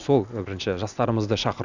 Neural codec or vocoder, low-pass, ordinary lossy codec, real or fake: none; 7.2 kHz; none; real